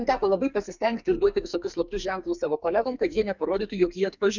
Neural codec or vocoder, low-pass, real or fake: codec, 44.1 kHz, 2.6 kbps, SNAC; 7.2 kHz; fake